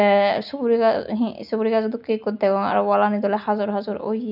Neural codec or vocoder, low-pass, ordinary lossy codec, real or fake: none; 5.4 kHz; none; real